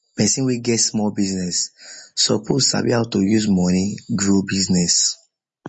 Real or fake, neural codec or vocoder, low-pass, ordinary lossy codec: real; none; 9.9 kHz; MP3, 32 kbps